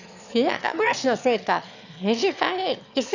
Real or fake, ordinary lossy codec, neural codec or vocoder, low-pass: fake; none; autoencoder, 22.05 kHz, a latent of 192 numbers a frame, VITS, trained on one speaker; 7.2 kHz